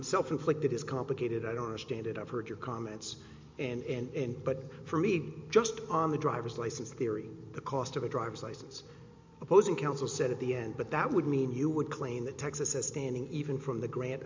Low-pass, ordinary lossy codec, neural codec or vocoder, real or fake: 7.2 kHz; MP3, 64 kbps; none; real